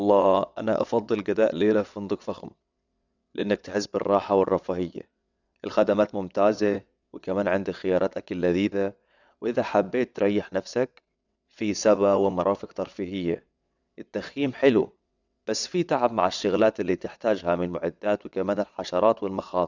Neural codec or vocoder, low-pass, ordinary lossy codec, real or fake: vocoder, 22.05 kHz, 80 mel bands, WaveNeXt; 7.2 kHz; none; fake